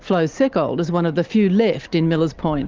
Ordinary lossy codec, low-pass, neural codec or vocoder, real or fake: Opus, 32 kbps; 7.2 kHz; none; real